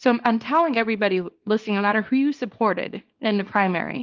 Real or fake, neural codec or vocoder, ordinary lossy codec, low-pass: fake; codec, 24 kHz, 0.9 kbps, WavTokenizer, small release; Opus, 32 kbps; 7.2 kHz